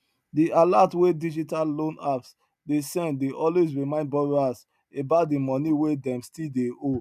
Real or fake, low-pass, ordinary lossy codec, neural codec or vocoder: real; 14.4 kHz; none; none